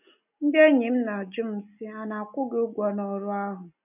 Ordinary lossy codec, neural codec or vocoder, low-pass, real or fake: none; none; 3.6 kHz; real